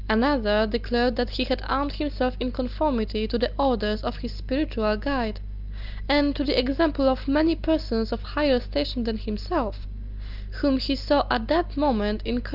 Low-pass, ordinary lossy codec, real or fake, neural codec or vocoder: 5.4 kHz; Opus, 24 kbps; real; none